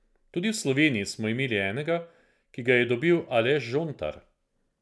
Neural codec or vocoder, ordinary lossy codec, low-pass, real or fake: none; none; none; real